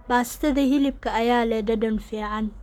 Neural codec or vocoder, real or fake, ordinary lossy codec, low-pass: codec, 44.1 kHz, 7.8 kbps, Pupu-Codec; fake; none; 19.8 kHz